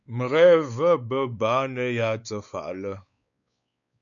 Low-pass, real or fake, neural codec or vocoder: 7.2 kHz; fake; codec, 16 kHz, 4 kbps, X-Codec, WavLM features, trained on Multilingual LibriSpeech